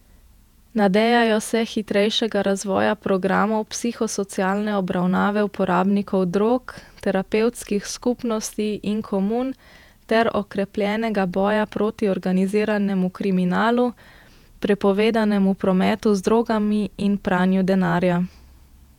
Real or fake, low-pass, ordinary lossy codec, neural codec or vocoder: fake; 19.8 kHz; none; vocoder, 48 kHz, 128 mel bands, Vocos